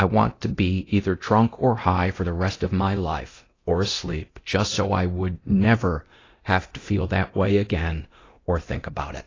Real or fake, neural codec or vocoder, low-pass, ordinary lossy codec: fake; codec, 24 kHz, 0.9 kbps, DualCodec; 7.2 kHz; AAC, 32 kbps